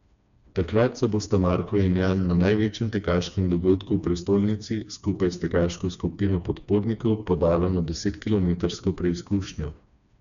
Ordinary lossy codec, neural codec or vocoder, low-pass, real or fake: none; codec, 16 kHz, 2 kbps, FreqCodec, smaller model; 7.2 kHz; fake